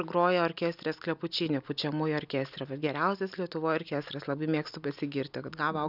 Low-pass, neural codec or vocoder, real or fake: 5.4 kHz; none; real